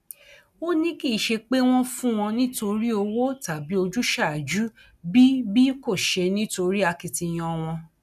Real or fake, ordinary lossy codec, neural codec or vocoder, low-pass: real; none; none; 14.4 kHz